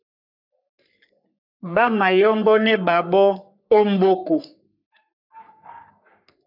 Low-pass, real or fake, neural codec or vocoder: 5.4 kHz; fake; codec, 44.1 kHz, 3.4 kbps, Pupu-Codec